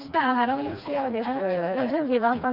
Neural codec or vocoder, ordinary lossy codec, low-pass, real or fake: codec, 16 kHz, 2 kbps, FreqCodec, smaller model; none; 5.4 kHz; fake